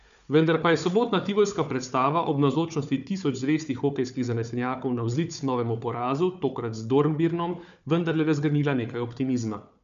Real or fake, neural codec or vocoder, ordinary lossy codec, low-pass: fake; codec, 16 kHz, 4 kbps, FunCodec, trained on Chinese and English, 50 frames a second; none; 7.2 kHz